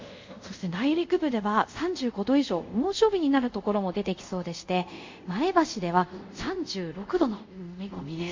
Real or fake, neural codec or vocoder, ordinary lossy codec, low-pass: fake; codec, 24 kHz, 0.5 kbps, DualCodec; MP3, 48 kbps; 7.2 kHz